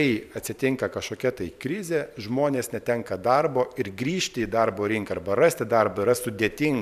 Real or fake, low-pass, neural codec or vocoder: fake; 14.4 kHz; vocoder, 44.1 kHz, 128 mel bands every 512 samples, BigVGAN v2